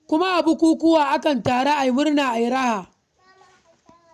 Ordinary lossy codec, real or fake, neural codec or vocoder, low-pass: none; real; none; 14.4 kHz